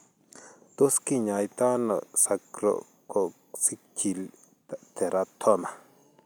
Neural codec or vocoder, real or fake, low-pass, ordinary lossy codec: none; real; none; none